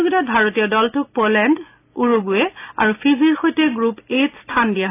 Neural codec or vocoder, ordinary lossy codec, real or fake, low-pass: none; AAC, 24 kbps; real; 3.6 kHz